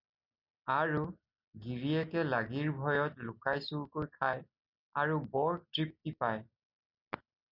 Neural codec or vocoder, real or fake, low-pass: none; real; 5.4 kHz